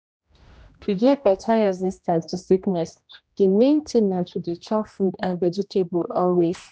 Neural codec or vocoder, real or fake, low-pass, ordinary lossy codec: codec, 16 kHz, 1 kbps, X-Codec, HuBERT features, trained on general audio; fake; none; none